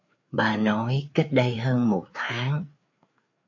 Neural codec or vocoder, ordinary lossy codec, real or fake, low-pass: codec, 16 kHz, 4 kbps, FreqCodec, larger model; MP3, 48 kbps; fake; 7.2 kHz